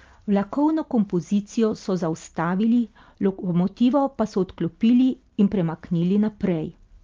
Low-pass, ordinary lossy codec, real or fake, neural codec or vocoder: 7.2 kHz; Opus, 32 kbps; real; none